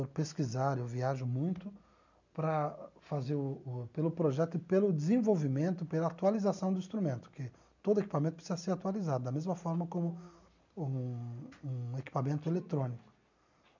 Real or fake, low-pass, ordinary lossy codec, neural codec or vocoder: real; 7.2 kHz; none; none